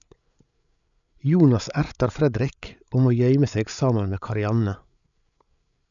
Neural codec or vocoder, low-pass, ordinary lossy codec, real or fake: codec, 16 kHz, 8 kbps, FreqCodec, larger model; 7.2 kHz; none; fake